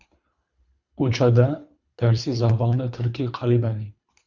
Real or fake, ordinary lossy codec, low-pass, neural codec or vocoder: fake; Opus, 64 kbps; 7.2 kHz; codec, 24 kHz, 3 kbps, HILCodec